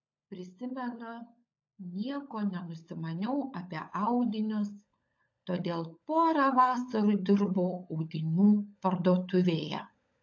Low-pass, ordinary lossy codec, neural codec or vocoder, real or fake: 7.2 kHz; AAC, 48 kbps; codec, 16 kHz, 16 kbps, FunCodec, trained on LibriTTS, 50 frames a second; fake